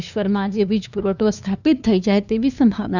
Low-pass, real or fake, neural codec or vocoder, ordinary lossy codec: 7.2 kHz; fake; codec, 16 kHz, 2 kbps, FunCodec, trained on LibriTTS, 25 frames a second; none